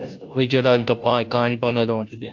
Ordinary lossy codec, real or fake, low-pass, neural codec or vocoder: AAC, 48 kbps; fake; 7.2 kHz; codec, 16 kHz, 0.5 kbps, FunCodec, trained on Chinese and English, 25 frames a second